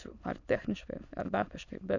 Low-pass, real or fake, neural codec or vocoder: 7.2 kHz; fake; autoencoder, 22.05 kHz, a latent of 192 numbers a frame, VITS, trained on many speakers